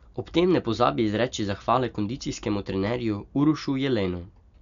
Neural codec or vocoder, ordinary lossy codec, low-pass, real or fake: none; none; 7.2 kHz; real